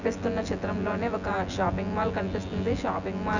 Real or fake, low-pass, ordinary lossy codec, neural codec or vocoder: fake; 7.2 kHz; none; vocoder, 24 kHz, 100 mel bands, Vocos